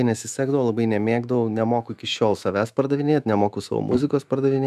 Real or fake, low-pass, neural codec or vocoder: fake; 14.4 kHz; autoencoder, 48 kHz, 128 numbers a frame, DAC-VAE, trained on Japanese speech